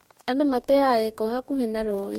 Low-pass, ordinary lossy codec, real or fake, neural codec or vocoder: 19.8 kHz; MP3, 64 kbps; fake; codec, 44.1 kHz, 2.6 kbps, DAC